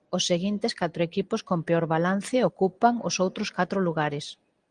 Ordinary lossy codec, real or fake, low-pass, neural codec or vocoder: Opus, 24 kbps; real; 9.9 kHz; none